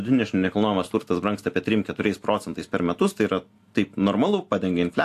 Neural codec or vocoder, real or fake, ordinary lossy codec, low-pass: none; real; AAC, 64 kbps; 14.4 kHz